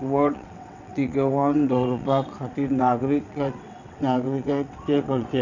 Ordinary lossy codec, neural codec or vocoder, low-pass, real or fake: none; vocoder, 22.05 kHz, 80 mel bands, WaveNeXt; 7.2 kHz; fake